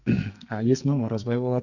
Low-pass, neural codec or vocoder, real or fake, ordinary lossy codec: 7.2 kHz; codec, 44.1 kHz, 2.6 kbps, SNAC; fake; none